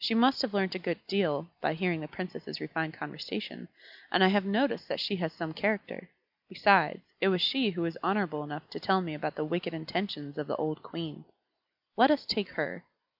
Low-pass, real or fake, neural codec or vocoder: 5.4 kHz; real; none